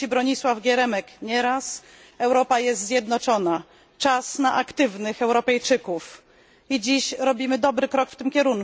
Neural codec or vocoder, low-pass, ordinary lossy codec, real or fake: none; none; none; real